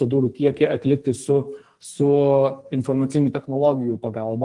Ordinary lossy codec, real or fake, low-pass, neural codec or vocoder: Opus, 24 kbps; fake; 10.8 kHz; codec, 44.1 kHz, 2.6 kbps, SNAC